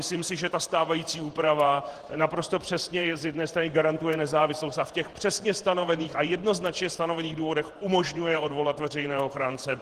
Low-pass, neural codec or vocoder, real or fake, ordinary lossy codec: 14.4 kHz; vocoder, 48 kHz, 128 mel bands, Vocos; fake; Opus, 16 kbps